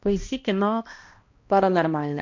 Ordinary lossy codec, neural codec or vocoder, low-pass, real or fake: MP3, 48 kbps; codec, 16 kHz, 1 kbps, X-Codec, HuBERT features, trained on balanced general audio; 7.2 kHz; fake